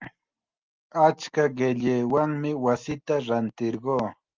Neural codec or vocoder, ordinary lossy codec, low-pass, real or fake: none; Opus, 32 kbps; 7.2 kHz; real